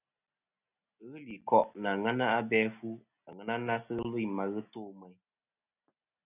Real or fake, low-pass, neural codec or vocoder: real; 3.6 kHz; none